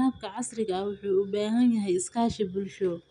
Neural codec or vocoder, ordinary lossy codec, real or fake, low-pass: none; none; real; 10.8 kHz